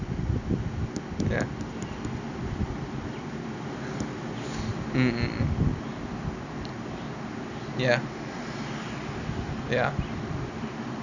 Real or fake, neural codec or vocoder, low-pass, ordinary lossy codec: real; none; 7.2 kHz; none